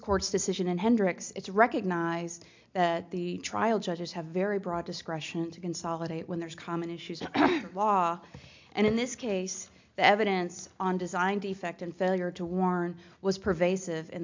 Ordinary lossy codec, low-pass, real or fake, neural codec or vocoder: MP3, 64 kbps; 7.2 kHz; real; none